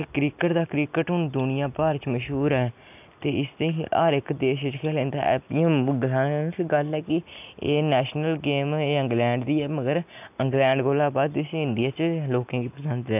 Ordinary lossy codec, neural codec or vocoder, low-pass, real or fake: AAC, 32 kbps; none; 3.6 kHz; real